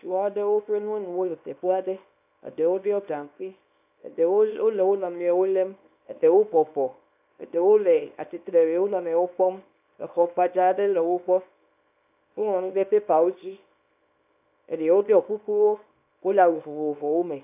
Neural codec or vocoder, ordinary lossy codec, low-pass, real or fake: codec, 24 kHz, 0.9 kbps, WavTokenizer, small release; AAC, 32 kbps; 3.6 kHz; fake